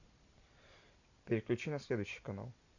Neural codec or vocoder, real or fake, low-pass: none; real; 7.2 kHz